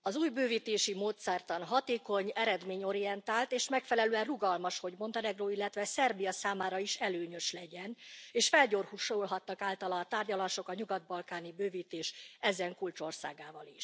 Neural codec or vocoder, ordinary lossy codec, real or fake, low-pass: none; none; real; none